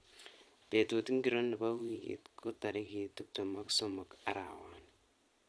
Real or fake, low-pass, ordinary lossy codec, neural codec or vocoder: fake; none; none; vocoder, 22.05 kHz, 80 mel bands, Vocos